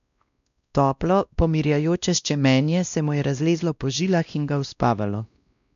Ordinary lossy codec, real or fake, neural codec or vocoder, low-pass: none; fake; codec, 16 kHz, 1 kbps, X-Codec, WavLM features, trained on Multilingual LibriSpeech; 7.2 kHz